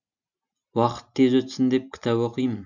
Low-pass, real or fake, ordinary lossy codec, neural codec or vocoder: 7.2 kHz; real; none; none